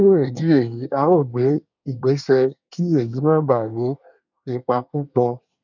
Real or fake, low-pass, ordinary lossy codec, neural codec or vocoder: fake; 7.2 kHz; none; codec, 24 kHz, 1 kbps, SNAC